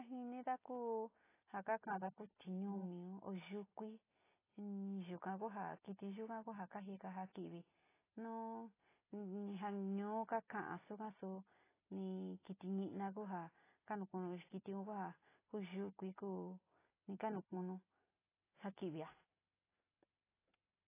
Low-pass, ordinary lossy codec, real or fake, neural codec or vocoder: 3.6 kHz; AAC, 16 kbps; real; none